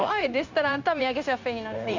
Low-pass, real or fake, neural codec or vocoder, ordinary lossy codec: 7.2 kHz; fake; codec, 16 kHz, 0.9 kbps, LongCat-Audio-Codec; none